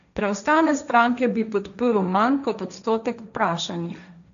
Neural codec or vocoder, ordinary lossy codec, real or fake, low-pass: codec, 16 kHz, 1.1 kbps, Voila-Tokenizer; none; fake; 7.2 kHz